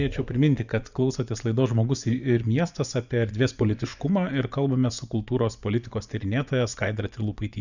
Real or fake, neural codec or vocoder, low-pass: fake; vocoder, 24 kHz, 100 mel bands, Vocos; 7.2 kHz